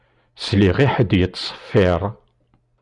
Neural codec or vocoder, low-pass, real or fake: none; 10.8 kHz; real